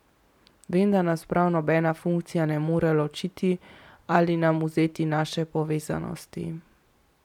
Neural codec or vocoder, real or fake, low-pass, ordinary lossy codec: vocoder, 44.1 kHz, 128 mel bands every 256 samples, BigVGAN v2; fake; 19.8 kHz; none